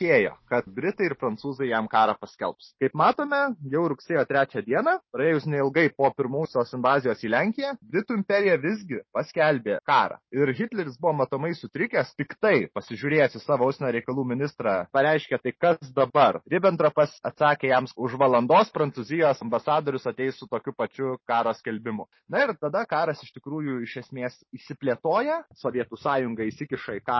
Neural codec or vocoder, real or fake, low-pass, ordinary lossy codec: none; real; 7.2 kHz; MP3, 24 kbps